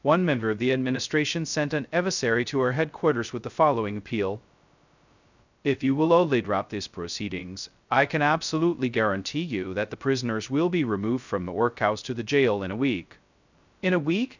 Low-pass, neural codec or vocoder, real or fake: 7.2 kHz; codec, 16 kHz, 0.2 kbps, FocalCodec; fake